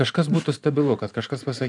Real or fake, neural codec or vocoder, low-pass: fake; vocoder, 44.1 kHz, 128 mel bands every 512 samples, BigVGAN v2; 10.8 kHz